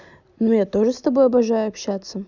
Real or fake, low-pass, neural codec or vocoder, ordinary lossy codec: real; 7.2 kHz; none; none